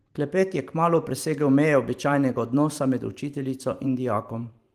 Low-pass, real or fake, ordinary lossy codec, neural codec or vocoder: 14.4 kHz; fake; Opus, 24 kbps; vocoder, 44.1 kHz, 128 mel bands every 512 samples, BigVGAN v2